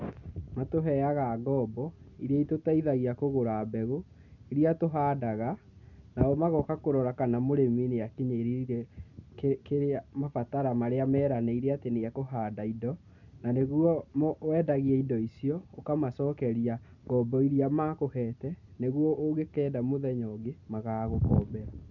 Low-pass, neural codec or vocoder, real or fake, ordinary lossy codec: 7.2 kHz; none; real; none